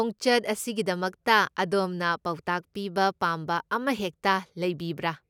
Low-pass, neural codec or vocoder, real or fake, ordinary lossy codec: 19.8 kHz; none; real; none